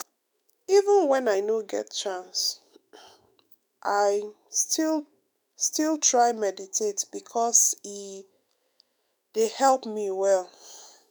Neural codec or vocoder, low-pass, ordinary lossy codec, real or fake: autoencoder, 48 kHz, 128 numbers a frame, DAC-VAE, trained on Japanese speech; none; none; fake